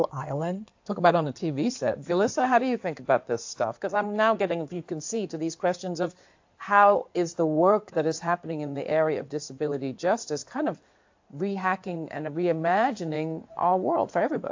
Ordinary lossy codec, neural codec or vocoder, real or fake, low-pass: AAC, 48 kbps; codec, 16 kHz in and 24 kHz out, 2.2 kbps, FireRedTTS-2 codec; fake; 7.2 kHz